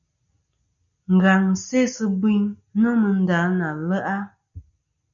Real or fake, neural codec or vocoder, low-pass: real; none; 7.2 kHz